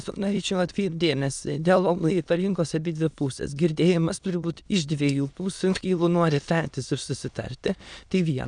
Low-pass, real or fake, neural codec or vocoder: 9.9 kHz; fake; autoencoder, 22.05 kHz, a latent of 192 numbers a frame, VITS, trained on many speakers